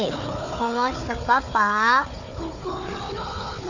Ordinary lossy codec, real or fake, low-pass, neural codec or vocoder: none; fake; 7.2 kHz; codec, 16 kHz, 4 kbps, FunCodec, trained on Chinese and English, 50 frames a second